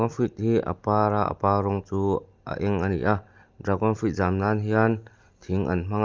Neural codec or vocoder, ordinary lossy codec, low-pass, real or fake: none; Opus, 32 kbps; 7.2 kHz; real